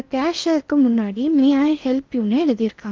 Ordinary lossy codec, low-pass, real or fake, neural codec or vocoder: Opus, 32 kbps; 7.2 kHz; fake; codec, 16 kHz in and 24 kHz out, 0.8 kbps, FocalCodec, streaming, 65536 codes